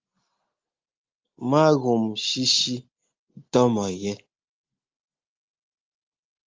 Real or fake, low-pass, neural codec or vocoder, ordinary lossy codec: real; 7.2 kHz; none; Opus, 32 kbps